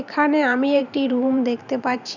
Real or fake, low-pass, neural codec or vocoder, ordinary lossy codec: fake; 7.2 kHz; vocoder, 44.1 kHz, 128 mel bands every 512 samples, BigVGAN v2; none